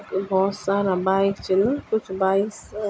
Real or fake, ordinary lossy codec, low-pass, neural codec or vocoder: real; none; none; none